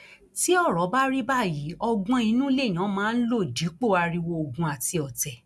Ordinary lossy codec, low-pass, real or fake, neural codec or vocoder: none; none; real; none